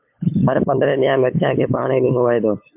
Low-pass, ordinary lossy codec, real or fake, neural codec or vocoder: 3.6 kHz; MP3, 32 kbps; fake; codec, 16 kHz, 8 kbps, FunCodec, trained on LibriTTS, 25 frames a second